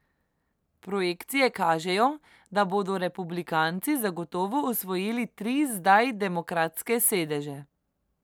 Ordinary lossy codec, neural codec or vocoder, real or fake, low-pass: none; none; real; none